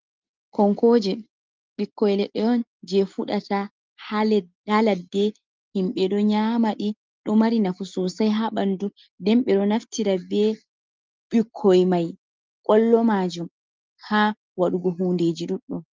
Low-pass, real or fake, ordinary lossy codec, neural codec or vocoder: 7.2 kHz; real; Opus, 32 kbps; none